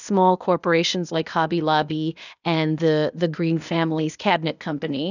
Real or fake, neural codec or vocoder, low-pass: fake; codec, 16 kHz, 0.8 kbps, ZipCodec; 7.2 kHz